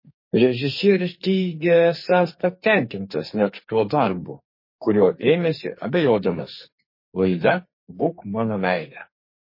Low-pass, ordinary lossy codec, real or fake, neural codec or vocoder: 5.4 kHz; MP3, 24 kbps; fake; codec, 44.1 kHz, 2.6 kbps, SNAC